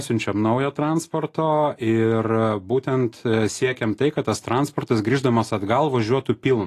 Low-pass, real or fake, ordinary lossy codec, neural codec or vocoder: 14.4 kHz; real; AAC, 48 kbps; none